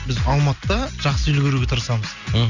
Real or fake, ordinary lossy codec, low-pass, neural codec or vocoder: real; none; 7.2 kHz; none